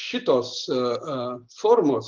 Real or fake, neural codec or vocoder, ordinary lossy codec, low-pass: real; none; Opus, 32 kbps; 7.2 kHz